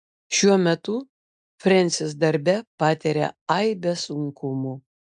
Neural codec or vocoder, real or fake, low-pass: none; real; 9.9 kHz